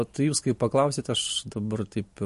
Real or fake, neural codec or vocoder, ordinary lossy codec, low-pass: real; none; MP3, 48 kbps; 14.4 kHz